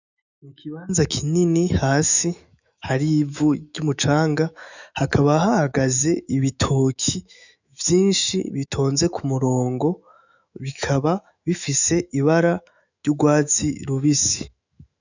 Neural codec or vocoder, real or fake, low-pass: autoencoder, 48 kHz, 128 numbers a frame, DAC-VAE, trained on Japanese speech; fake; 7.2 kHz